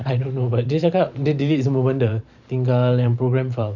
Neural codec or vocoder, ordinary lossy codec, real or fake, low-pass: vocoder, 44.1 kHz, 128 mel bands every 512 samples, BigVGAN v2; none; fake; 7.2 kHz